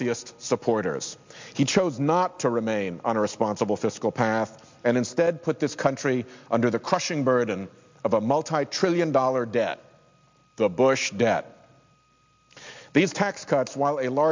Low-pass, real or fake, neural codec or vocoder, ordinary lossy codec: 7.2 kHz; real; none; MP3, 64 kbps